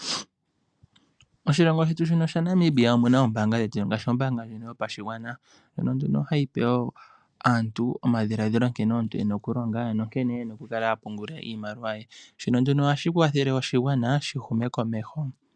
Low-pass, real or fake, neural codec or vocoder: 9.9 kHz; real; none